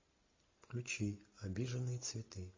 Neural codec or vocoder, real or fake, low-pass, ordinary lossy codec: none; real; 7.2 kHz; MP3, 32 kbps